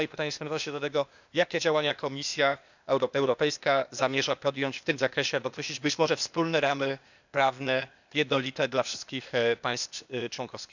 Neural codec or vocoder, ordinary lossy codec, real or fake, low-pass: codec, 16 kHz, 0.8 kbps, ZipCodec; none; fake; 7.2 kHz